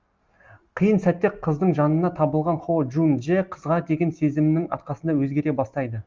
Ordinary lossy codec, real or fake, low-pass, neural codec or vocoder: Opus, 32 kbps; real; 7.2 kHz; none